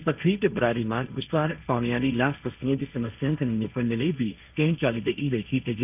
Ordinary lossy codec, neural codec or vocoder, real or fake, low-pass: none; codec, 16 kHz, 1.1 kbps, Voila-Tokenizer; fake; 3.6 kHz